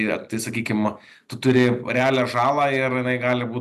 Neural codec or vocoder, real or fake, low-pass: none; real; 14.4 kHz